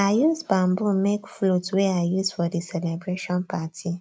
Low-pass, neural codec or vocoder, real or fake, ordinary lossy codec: none; none; real; none